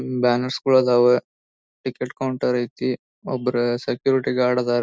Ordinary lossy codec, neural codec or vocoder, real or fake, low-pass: none; none; real; none